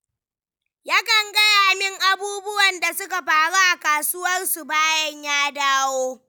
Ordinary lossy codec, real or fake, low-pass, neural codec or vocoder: none; real; none; none